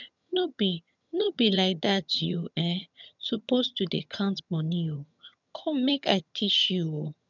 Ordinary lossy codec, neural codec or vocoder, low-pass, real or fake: none; vocoder, 22.05 kHz, 80 mel bands, HiFi-GAN; 7.2 kHz; fake